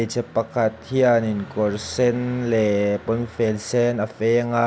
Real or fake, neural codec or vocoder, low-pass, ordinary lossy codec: real; none; none; none